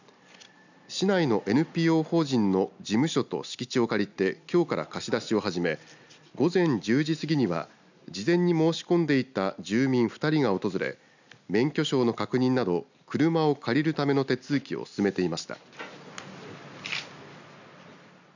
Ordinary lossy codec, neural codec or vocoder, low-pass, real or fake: none; none; 7.2 kHz; real